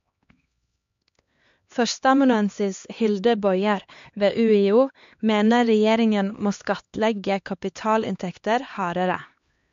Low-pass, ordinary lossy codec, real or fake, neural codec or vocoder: 7.2 kHz; MP3, 48 kbps; fake; codec, 16 kHz, 2 kbps, X-Codec, HuBERT features, trained on LibriSpeech